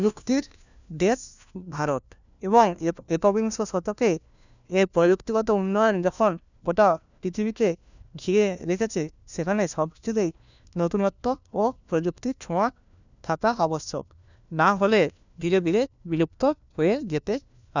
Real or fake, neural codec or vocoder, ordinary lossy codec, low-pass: fake; codec, 16 kHz, 1 kbps, FunCodec, trained on LibriTTS, 50 frames a second; none; 7.2 kHz